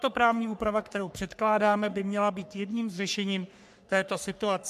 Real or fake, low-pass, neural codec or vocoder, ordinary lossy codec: fake; 14.4 kHz; codec, 44.1 kHz, 3.4 kbps, Pupu-Codec; MP3, 96 kbps